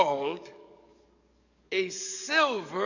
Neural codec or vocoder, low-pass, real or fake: codec, 44.1 kHz, 7.8 kbps, DAC; 7.2 kHz; fake